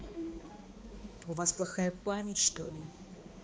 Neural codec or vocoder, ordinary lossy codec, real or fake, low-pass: codec, 16 kHz, 2 kbps, X-Codec, HuBERT features, trained on balanced general audio; none; fake; none